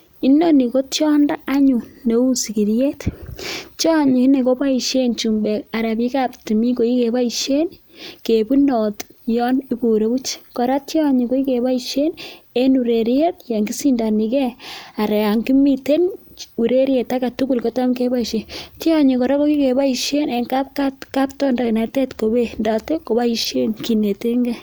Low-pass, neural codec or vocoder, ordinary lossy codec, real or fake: none; none; none; real